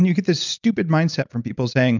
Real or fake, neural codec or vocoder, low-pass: real; none; 7.2 kHz